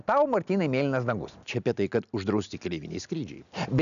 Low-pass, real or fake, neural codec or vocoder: 7.2 kHz; real; none